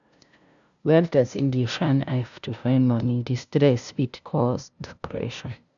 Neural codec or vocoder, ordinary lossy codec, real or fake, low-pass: codec, 16 kHz, 0.5 kbps, FunCodec, trained on LibriTTS, 25 frames a second; none; fake; 7.2 kHz